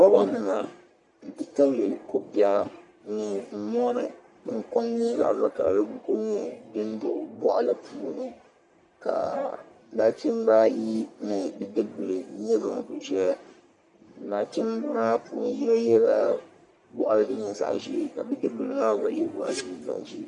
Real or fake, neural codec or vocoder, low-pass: fake; codec, 44.1 kHz, 1.7 kbps, Pupu-Codec; 10.8 kHz